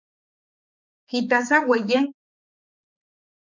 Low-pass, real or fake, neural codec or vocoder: 7.2 kHz; fake; codec, 16 kHz, 4 kbps, X-Codec, HuBERT features, trained on balanced general audio